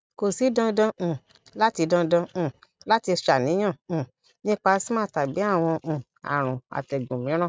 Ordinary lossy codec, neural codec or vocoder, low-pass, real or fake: none; none; none; real